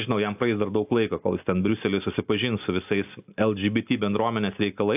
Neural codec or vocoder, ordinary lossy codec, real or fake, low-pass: none; AAC, 32 kbps; real; 3.6 kHz